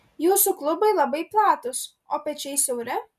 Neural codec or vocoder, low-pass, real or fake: none; 14.4 kHz; real